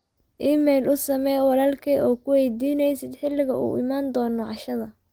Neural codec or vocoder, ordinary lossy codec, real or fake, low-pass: none; Opus, 24 kbps; real; 19.8 kHz